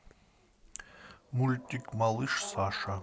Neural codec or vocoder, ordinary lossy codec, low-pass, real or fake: none; none; none; real